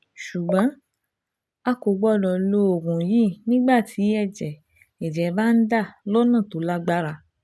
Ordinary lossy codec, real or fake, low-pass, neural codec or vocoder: none; real; none; none